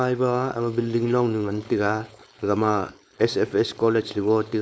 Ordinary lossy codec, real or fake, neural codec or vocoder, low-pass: none; fake; codec, 16 kHz, 4.8 kbps, FACodec; none